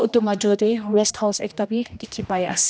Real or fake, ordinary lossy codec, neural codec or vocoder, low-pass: fake; none; codec, 16 kHz, 1 kbps, X-Codec, HuBERT features, trained on general audio; none